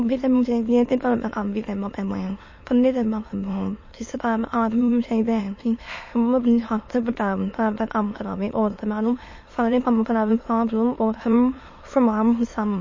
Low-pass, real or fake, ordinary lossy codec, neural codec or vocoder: 7.2 kHz; fake; MP3, 32 kbps; autoencoder, 22.05 kHz, a latent of 192 numbers a frame, VITS, trained on many speakers